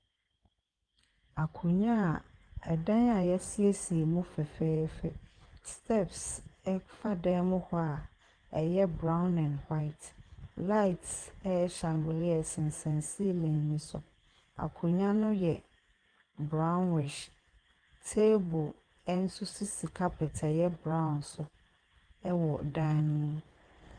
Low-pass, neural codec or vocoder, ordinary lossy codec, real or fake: 9.9 kHz; codec, 16 kHz in and 24 kHz out, 2.2 kbps, FireRedTTS-2 codec; Opus, 32 kbps; fake